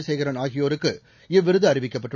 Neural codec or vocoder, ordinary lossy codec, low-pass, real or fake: none; none; 7.2 kHz; real